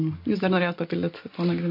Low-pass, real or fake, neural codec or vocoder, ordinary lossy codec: 5.4 kHz; real; none; MP3, 32 kbps